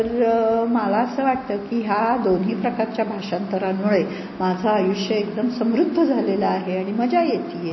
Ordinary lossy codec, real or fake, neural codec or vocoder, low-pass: MP3, 24 kbps; real; none; 7.2 kHz